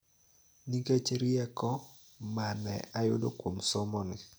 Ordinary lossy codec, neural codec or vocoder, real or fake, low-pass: none; none; real; none